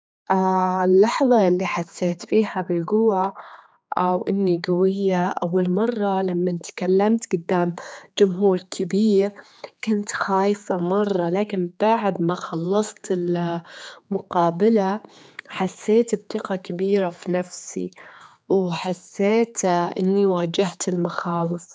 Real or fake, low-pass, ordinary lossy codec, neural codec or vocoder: fake; none; none; codec, 16 kHz, 4 kbps, X-Codec, HuBERT features, trained on general audio